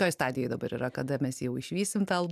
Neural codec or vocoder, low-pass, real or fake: none; 14.4 kHz; real